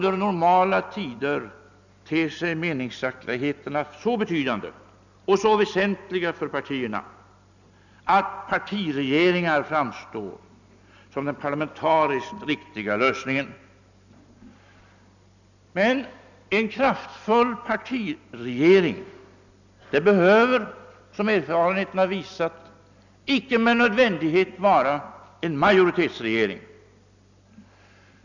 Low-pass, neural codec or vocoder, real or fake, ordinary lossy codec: 7.2 kHz; none; real; none